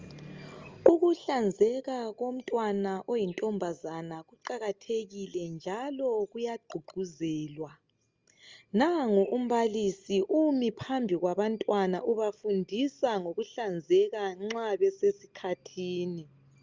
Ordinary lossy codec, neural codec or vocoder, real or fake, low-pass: Opus, 32 kbps; none; real; 7.2 kHz